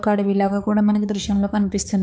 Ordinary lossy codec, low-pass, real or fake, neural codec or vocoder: none; none; fake; codec, 16 kHz, 4 kbps, X-Codec, HuBERT features, trained on balanced general audio